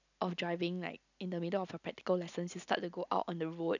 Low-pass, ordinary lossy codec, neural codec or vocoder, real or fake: 7.2 kHz; none; none; real